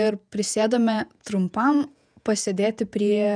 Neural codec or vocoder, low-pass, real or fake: vocoder, 48 kHz, 128 mel bands, Vocos; 9.9 kHz; fake